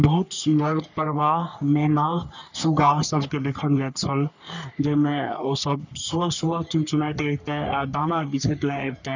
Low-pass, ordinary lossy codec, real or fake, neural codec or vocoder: 7.2 kHz; none; fake; codec, 44.1 kHz, 3.4 kbps, Pupu-Codec